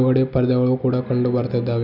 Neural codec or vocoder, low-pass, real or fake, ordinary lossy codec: none; 5.4 kHz; real; none